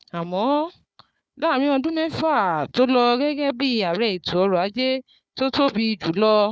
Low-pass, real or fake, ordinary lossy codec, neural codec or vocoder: none; fake; none; codec, 16 kHz, 4 kbps, FunCodec, trained on Chinese and English, 50 frames a second